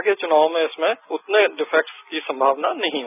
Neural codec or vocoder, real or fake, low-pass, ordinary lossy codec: none; real; 3.6 kHz; none